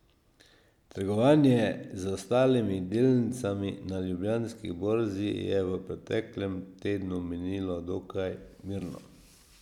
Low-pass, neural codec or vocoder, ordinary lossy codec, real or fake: 19.8 kHz; none; none; real